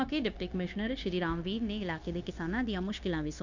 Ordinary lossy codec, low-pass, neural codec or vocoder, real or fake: none; 7.2 kHz; codec, 16 kHz, 0.9 kbps, LongCat-Audio-Codec; fake